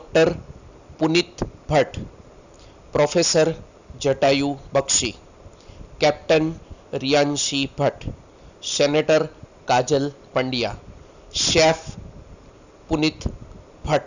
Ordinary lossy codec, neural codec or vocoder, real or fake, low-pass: none; none; real; 7.2 kHz